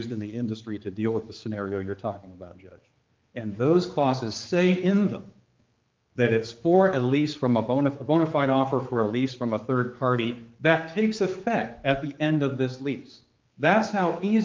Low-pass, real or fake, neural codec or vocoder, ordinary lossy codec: 7.2 kHz; fake; codec, 16 kHz, 4 kbps, X-Codec, HuBERT features, trained on general audio; Opus, 32 kbps